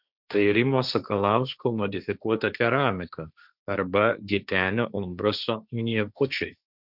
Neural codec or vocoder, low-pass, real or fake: codec, 16 kHz, 1.1 kbps, Voila-Tokenizer; 5.4 kHz; fake